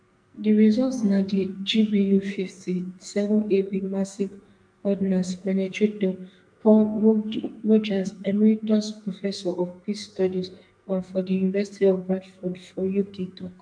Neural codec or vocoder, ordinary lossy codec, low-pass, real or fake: codec, 44.1 kHz, 2.6 kbps, SNAC; MP3, 64 kbps; 9.9 kHz; fake